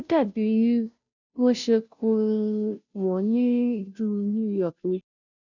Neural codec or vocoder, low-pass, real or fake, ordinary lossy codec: codec, 16 kHz, 0.5 kbps, FunCodec, trained on Chinese and English, 25 frames a second; 7.2 kHz; fake; none